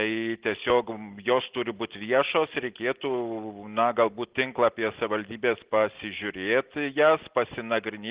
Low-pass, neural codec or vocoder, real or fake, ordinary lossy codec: 3.6 kHz; none; real; Opus, 16 kbps